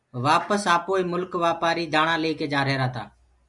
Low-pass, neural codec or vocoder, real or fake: 10.8 kHz; none; real